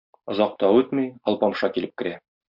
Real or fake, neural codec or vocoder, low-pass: real; none; 5.4 kHz